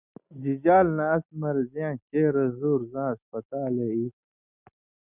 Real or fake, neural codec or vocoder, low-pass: real; none; 3.6 kHz